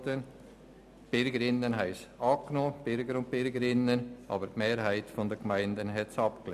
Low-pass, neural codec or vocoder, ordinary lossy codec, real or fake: 14.4 kHz; none; none; real